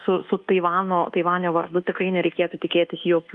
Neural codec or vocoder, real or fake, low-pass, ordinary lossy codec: codec, 24 kHz, 1.2 kbps, DualCodec; fake; 10.8 kHz; Opus, 32 kbps